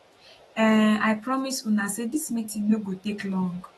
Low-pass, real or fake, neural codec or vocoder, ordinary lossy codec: 19.8 kHz; fake; autoencoder, 48 kHz, 128 numbers a frame, DAC-VAE, trained on Japanese speech; AAC, 32 kbps